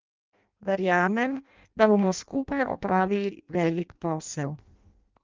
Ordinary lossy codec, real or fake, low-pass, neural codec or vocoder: Opus, 24 kbps; fake; 7.2 kHz; codec, 16 kHz in and 24 kHz out, 0.6 kbps, FireRedTTS-2 codec